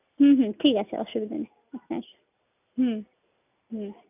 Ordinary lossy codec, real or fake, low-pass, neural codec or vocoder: none; real; 3.6 kHz; none